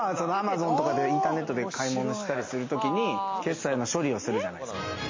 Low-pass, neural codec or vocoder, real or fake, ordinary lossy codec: 7.2 kHz; none; real; MP3, 32 kbps